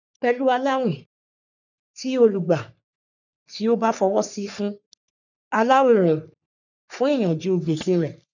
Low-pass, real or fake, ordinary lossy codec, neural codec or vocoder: 7.2 kHz; fake; none; codec, 44.1 kHz, 3.4 kbps, Pupu-Codec